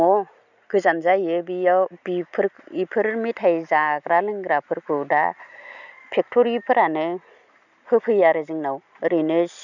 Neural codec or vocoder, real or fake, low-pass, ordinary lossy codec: none; real; 7.2 kHz; none